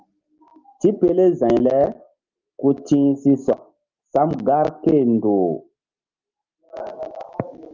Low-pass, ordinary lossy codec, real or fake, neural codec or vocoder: 7.2 kHz; Opus, 24 kbps; real; none